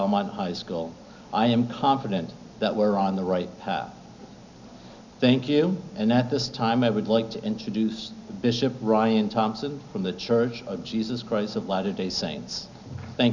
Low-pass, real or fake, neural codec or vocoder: 7.2 kHz; real; none